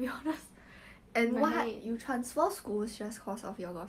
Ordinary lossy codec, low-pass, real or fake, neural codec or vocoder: Opus, 32 kbps; 14.4 kHz; real; none